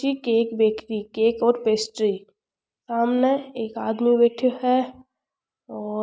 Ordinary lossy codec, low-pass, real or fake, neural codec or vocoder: none; none; real; none